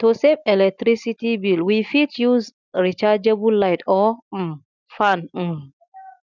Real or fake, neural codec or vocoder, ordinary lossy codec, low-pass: real; none; none; 7.2 kHz